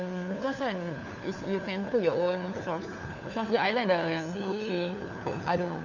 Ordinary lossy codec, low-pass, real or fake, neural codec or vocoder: none; 7.2 kHz; fake; codec, 16 kHz, 4 kbps, FunCodec, trained on LibriTTS, 50 frames a second